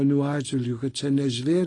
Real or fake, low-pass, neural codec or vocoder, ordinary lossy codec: real; 10.8 kHz; none; AAC, 32 kbps